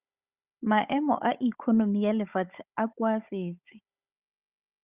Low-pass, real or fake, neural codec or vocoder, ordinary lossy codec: 3.6 kHz; fake; codec, 16 kHz, 16 kbps, FunCodec, trained on Chinese and English, 50 frames a second; Opus, 64 kbps